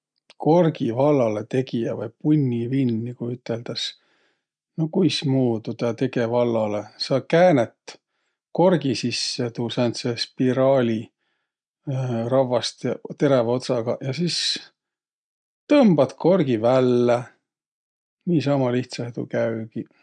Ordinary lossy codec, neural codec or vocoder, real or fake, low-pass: none; none; real; 9.9 kHz